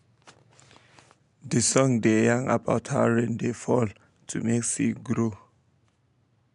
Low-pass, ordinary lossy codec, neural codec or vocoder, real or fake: 10.8 kHz; MP3, 96 kbps; none; real